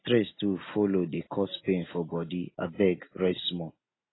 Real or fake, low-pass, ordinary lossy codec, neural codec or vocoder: real; 7.2 kHz; AAC, 16 kbps; none